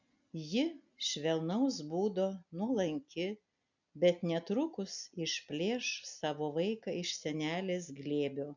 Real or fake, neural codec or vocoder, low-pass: real; none; 7.2 kHz